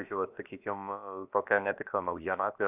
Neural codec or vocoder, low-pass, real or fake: codec, 16 kHz, about 1 kbps, DyCAST, with the encoder's durations; 3.6 kHz; fake